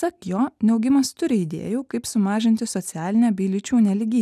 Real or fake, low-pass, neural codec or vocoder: real; 14.4 kHz; none